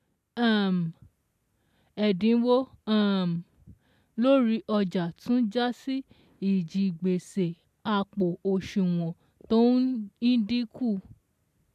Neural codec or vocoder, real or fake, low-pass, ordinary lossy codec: none; real; 14.4 kHz; AAC, 96 kbps